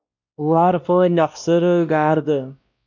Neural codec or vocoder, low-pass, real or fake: codec, 16 kHz, 1 kbps, X-Codec, WavLM features, trained on Multilingual LibriSpeech; 7.2 kHz; fake